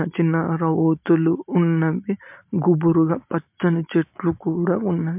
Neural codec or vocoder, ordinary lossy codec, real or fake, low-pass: none; MP3, 32 kbps; real; 3.6 kHz